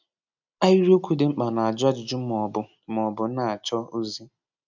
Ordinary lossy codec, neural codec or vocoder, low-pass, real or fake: none; none; 7.2 kHz; real